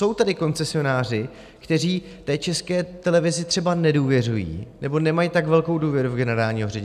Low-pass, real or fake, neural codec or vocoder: 14.4 kHz; real; none